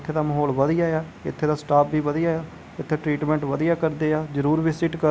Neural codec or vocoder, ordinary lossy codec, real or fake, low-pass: none; none; real; none